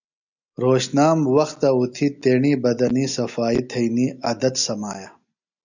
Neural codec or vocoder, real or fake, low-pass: none; real; 7.2 kHz